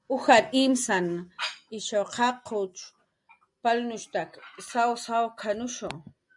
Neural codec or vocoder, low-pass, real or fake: none; 10.8 kHz; real